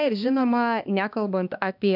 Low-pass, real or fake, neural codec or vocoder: 5.4 kHz; fake; codec, 16 kHz, 2 kbps, X-Codec, HuBERT features, trained on balanced general audio